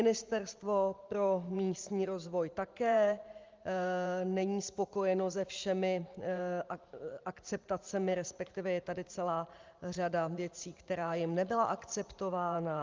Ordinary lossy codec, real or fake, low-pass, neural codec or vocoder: Opus, 32 kbps; fake; 7.2 kHz; vocoder, 44.1 kHz, 128 mel bands every 512 samples, BigVGAN v2